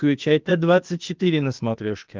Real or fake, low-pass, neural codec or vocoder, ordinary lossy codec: fake; 7.2 kHz; codec, 16 kHz, 0.8 kbps, ZipCodec; Opus, 32 kbps